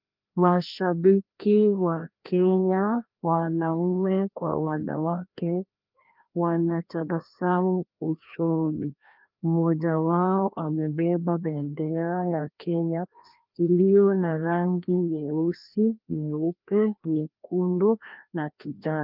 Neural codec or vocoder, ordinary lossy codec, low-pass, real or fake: codec, 16 kHz, 1 kbps, FreqCodec, larger model; Opus, 24 kbps; 5.4 kHz; fake